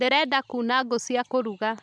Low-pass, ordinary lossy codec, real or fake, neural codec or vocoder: none; none; real; none